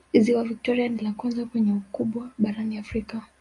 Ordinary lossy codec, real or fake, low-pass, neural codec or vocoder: AAC, 48 kbps; real; 10.8 kHz; none